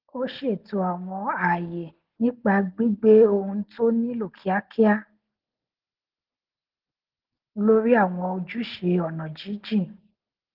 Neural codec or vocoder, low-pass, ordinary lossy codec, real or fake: none; 5.4 kHz; Opus, 16 kbps; real